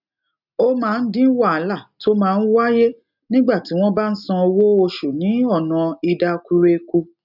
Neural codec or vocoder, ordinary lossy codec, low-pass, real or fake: none; none; 5.4 kHz; real